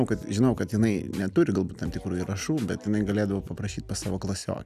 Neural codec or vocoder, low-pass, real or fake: none; 14.4 kHz; real